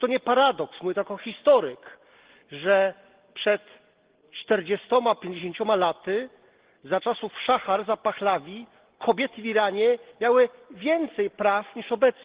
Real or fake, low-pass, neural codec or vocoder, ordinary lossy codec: real; 3.6 kHz; none; Opus, 16 kbps